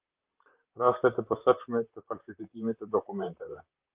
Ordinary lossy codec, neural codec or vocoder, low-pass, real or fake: Opus, 32 kbps; vocoder, 44.1 kHz, 128 mel bands, Pupu-Vocoder; 3.6 kHz; fake